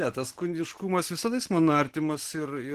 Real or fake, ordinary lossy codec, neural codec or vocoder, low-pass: real; Opus, 16 kbps; none; 14.4 kHz